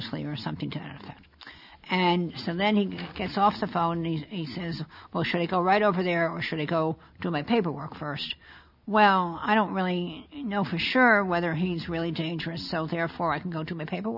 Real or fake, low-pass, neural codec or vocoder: real; 5.4 kHz; none